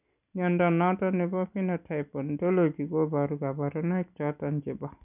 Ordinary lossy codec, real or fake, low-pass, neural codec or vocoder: none; real; 3.6 kHz; none